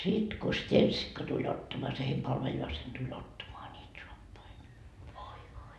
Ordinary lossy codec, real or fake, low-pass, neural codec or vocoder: none; real; none; none